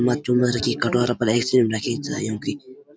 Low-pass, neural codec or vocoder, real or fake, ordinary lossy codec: none; none; real; none